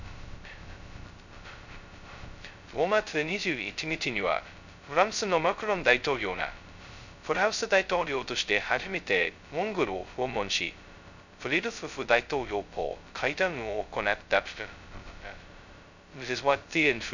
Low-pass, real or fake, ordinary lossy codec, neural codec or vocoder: 7.2 kHz; fake; none; codec, 16 kHz, 0.2 kbps, FocalCodec